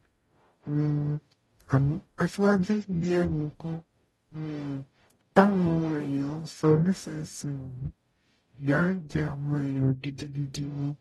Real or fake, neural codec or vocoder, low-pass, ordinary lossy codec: fake; codec, 44.1 kHz, 0.9 kbps, DAC; 19.8 kHz; AAC, 32 kbps